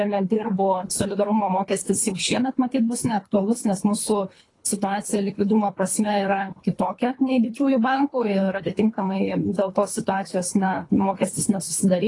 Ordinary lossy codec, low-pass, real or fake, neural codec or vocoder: AAC, 32 kbps; 10.8 kHz; fake; codec, 24 kHz, 3 kbps, HILCodec